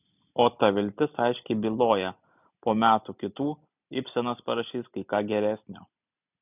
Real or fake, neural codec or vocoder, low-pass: real; none; 3.6 kHz